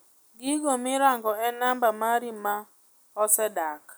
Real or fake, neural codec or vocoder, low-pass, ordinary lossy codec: real; none; none; none